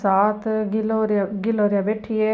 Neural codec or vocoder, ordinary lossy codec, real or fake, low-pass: none; none; real; none